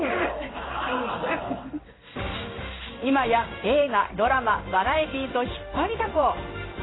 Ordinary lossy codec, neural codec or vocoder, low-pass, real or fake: AAC, 16 kbps; codec, 16 kHz in and 24 kHz out, 1 kbps, XY-Tokenizer; 7.2 kHz; fake